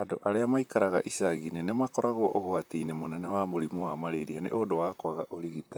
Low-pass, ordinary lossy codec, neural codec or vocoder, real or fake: none; none; vocoder, 44.1 kHz, 128 mel bands, Pupu-Vocoder; fake